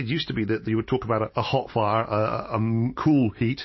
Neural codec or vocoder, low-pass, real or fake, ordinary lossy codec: none; 7.2 kHz; real; MP3, 24 kbps